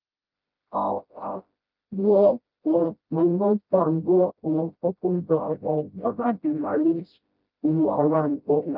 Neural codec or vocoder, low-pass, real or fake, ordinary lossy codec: codec, 16 kHz, 0.5 kbps, FreqCodec, smaller model; 5.4 kHz; fake; Opus, 32 kbps